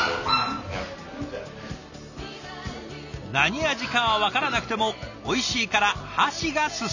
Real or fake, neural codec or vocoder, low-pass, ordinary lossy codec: real; none; 7.2 kHz; none